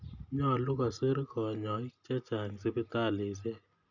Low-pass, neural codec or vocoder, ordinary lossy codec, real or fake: 7.2 kHz; none; none; real